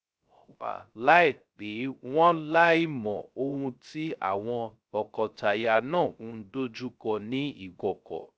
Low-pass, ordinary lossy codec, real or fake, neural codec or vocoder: none; none; fake; codec, 16 kHz, 0.3 kbps, FocalCodec